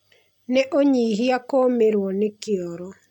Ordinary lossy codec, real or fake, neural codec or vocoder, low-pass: none; real; none; 19.8 kHz